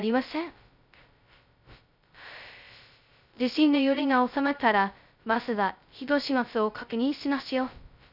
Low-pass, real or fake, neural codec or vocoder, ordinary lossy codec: 5.4 kHz; fake; codec, 16 kHz, 0.2 kbps, FocalCodec; none